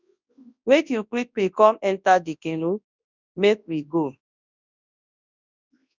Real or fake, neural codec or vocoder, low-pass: fake; codec, 24 kHz, 0.9 kbps, WavTokenizer, large speech release; 7.2 kHz